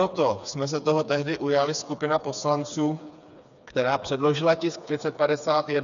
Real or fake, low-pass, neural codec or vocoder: fake; 7.2 kHz; codec, 16 kHz, 4 kbps, FreqCodec, smaller model